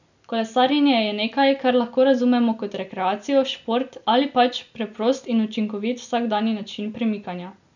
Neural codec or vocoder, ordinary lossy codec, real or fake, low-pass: none; none; real; 7.2 kHz